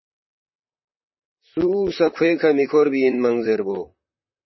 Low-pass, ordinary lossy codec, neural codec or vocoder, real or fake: 7.2 kHz; MP3, 24 kbps; vocoder, 44.1 kHz, 128 mel bands, Pupu-Vocoder; fake